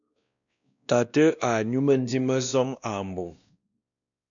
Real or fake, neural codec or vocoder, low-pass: fake; codec, 16 kHz, 1 kbps, X-Codec, WavLM features, trained on Multilingual LibriSpeech; 7.2 kHz